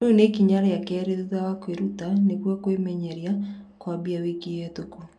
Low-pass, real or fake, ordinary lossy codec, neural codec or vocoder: none; real; none; none